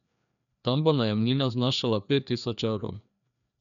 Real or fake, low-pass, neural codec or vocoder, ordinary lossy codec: fake; 7.2 kHz; codec, 16 kHz, 2 kbps, FreqCodec, larger model; none